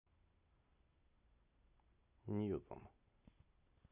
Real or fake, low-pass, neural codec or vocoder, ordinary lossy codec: real; 3.6 kHz; none; none